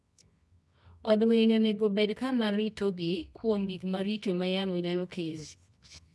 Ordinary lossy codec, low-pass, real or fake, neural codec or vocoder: none; none; fake; codec, 24 kHz, 0.9 kbps, WavTokenizer, medium music audio release